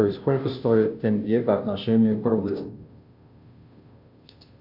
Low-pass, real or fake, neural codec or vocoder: 5.4 kHz; fake; codec, 16 kHz, 0.5 kbps, FunCodec, trained on Chinese and English, 25 frames a second